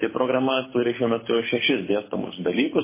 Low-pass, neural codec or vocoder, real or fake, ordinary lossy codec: 3.6 kHz; codec, 24 kHz, 6 kbps, HILCodec; fake; MP3, 16 kbps